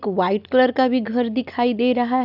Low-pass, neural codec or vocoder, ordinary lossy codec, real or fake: 5.4 kHz; none; none; real